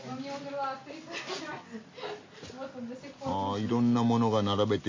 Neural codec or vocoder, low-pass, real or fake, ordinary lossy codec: none; 7.2 kHz; real; MP3, 32 kbps